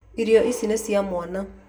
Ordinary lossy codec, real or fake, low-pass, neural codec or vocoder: none; real; none; none